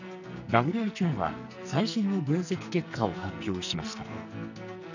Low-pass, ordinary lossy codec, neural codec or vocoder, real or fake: 7.2 kHz; none; codec, 44.1 kHz, 2.6 kbps, SNAC; fake